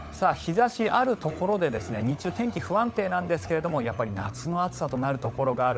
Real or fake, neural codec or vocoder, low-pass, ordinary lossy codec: fake; codec, 16 kHz, 16 kbps, FunCodec, trained on LibriTTS, 50 frames a second; none; none